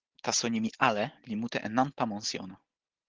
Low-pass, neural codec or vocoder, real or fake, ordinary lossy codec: 7.2 kHz; none; real; Opus, 16 kbps